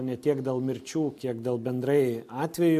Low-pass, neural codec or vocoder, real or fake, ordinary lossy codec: 14.4 kHz; none; real; MP3, 64 kbps